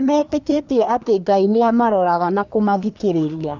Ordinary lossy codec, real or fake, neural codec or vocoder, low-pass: none; fake; codec, 24 kHz, 1 kbps, SNAC; 7.2 kHz